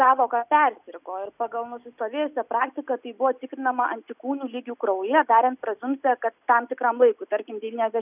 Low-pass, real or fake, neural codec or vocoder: 3.6 kHz; real; none